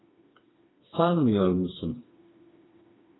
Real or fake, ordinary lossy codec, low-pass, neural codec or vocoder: fake; AAC, 16 kbps; 7.2 kHz; codec, 16 kHz, 2 kbps, FreqCodec, smaller model